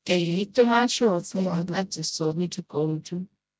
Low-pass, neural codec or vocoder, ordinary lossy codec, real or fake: none; codec, 16 kHz, 0.5 kbps, FreqCodec, smaller model; none; fake